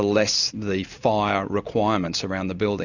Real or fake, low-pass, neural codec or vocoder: real; 7.2 kHz; none